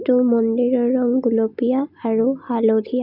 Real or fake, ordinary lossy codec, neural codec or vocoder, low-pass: real; none; none; 5.4 kHz